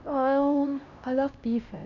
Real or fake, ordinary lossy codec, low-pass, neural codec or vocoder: fake; none; 7.2 kHz; codec, 16 kHz, 1 kbps, X-Codec, HuBERT features, trained on LibriSpeech